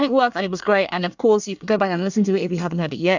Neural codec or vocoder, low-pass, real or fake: codec, 24 kHz, 1 kbps, SNAC; 7.2 kHz; fake